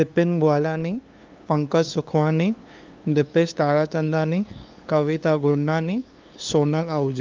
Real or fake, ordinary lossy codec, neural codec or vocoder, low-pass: fake; Opus, 32 kbps; codec, 16 kHz, 2 kbps, FunCodec, trained on LibriTTS, 25 frames a second; 7.2 kHz